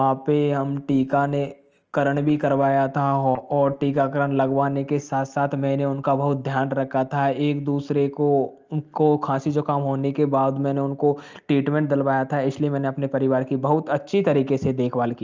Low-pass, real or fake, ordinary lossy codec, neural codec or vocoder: 7.2 kHz; real; Opus, 24 kbps; none